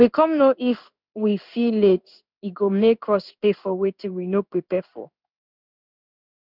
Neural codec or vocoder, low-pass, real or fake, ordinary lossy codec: codec, 16 kHz in and 24 kHz out, 1 kbps, XY-Tokenizer; 5.4 kHz; fake; none